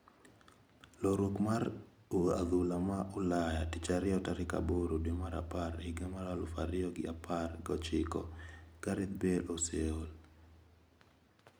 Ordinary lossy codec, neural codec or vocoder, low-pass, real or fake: none; none; none; real